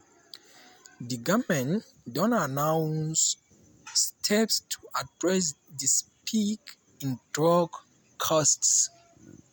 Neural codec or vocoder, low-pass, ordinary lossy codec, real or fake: none; none; none; real